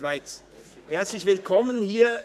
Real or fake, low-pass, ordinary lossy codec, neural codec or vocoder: fake; 14.4 kHz; none; codec, 32 kHz, 1.9 kbps, SNAC